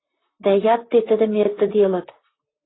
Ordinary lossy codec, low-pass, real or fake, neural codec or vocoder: AAC, 16 kbps; 7.2 kHz; fake; vocoder, 44.1 kHz, 128 mel bands, Pupu-Vocoder